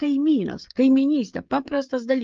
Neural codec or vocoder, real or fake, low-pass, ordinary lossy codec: codec, 16 kHz, 16 kbps, FreqCodec, smaller model; fake; 7.2 kHz; Opus, 24 kbps